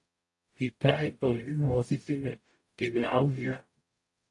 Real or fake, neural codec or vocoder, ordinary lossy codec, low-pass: fake; codec, 44.1 kHz, 0.9 kbps, DAC; AAC, 48 kbps; 10.8 kHz